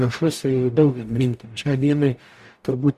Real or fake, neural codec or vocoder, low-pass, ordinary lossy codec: fake; codec, 44.1 kHz, 0.9 kbps, DAC; 14.4 kHz; Opus, 64 kbps